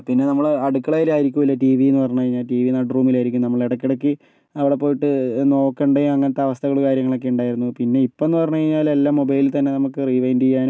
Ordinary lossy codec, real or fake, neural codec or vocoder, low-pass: none; real; none; none